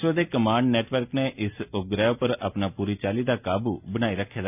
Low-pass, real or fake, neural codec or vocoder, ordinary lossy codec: 3.6 kHz; real; none; none